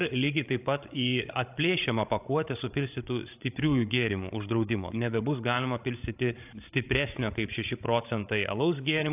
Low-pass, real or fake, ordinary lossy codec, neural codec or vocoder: 3.6 kHz; fake; AAC, 32 kbps; codec, 16 kHz, 16 kbps, FreqCodec, larger model